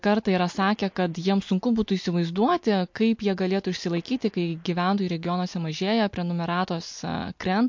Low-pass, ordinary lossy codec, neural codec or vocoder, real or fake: 7.2 kHz; MP3, 48 kbps; none; real